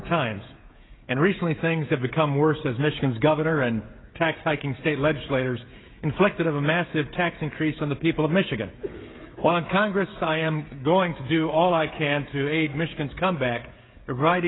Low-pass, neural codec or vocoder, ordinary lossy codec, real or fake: 7.2 kHz; codec, 16 kHz, 16 kbps, FreqCodec, smaller model; AAC, 16 kbps; fake